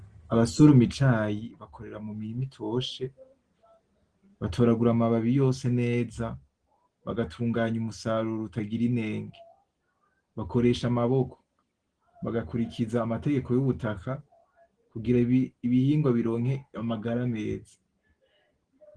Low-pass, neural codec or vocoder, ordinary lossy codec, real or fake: 9.9 kHz; none; Opus, 16 kbps; real